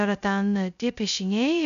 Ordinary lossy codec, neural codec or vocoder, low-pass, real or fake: MP3, 96 kbps; codec, 16 kHz, 0.2 kbps, FocalCodec; 7.2 kHz; fake